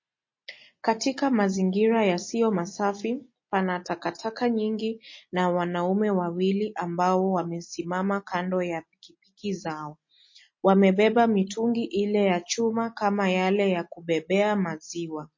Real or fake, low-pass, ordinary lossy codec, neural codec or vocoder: real; 7.2 kHz; MP3, 32 kbps; none